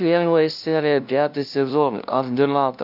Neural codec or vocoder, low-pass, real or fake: codec, 16 kHz, 0.5 kbps, FunCodec, trained on LibriTTS, 25 frames a second; 5.4 kHz; fake